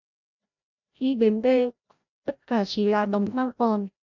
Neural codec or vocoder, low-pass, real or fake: codec, 16 kHz, 0.5 kbps, FreqCodec, larger model; 7.2 kHz; fake